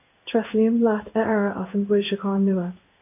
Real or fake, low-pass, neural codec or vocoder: fake; 3.6 kHz; codec, 16 kHz in and 24 kHz out, 1 kbps, XY-Tokenizer